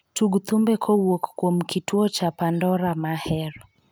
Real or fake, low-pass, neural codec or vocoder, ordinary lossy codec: real; none; none; none